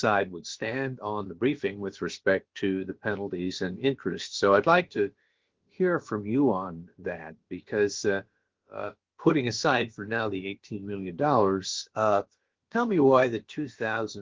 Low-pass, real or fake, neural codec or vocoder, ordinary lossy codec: 7.2 kHz; fake; codec, 16 kHz, about 1 kbps, DyCAST, with the encoder's durations; Opus, 16 kbps